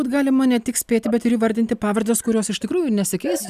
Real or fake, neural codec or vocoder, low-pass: real; none; 14.4 kHz